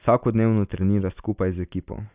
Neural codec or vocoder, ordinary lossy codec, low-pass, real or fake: none; Opus, 64 kbps; 3.6 kHz; real